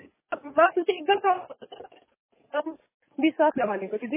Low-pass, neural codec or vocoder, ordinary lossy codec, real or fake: 3.6 kHz; codec, 44.1 kHz, 7.8 kbps, DAC; MP3, 16 kbps; fake